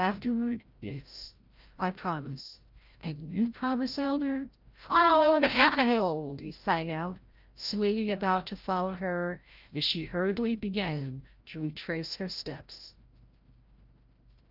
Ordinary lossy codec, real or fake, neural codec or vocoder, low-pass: Opus, 32 kbps; fake; codec, 16 kHz, 0.5 kbps, FreqCodec, larger model; 5.4 kHz